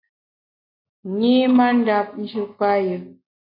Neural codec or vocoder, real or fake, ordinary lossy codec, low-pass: none; real; MP3, 32 kbps; 5.4 kHz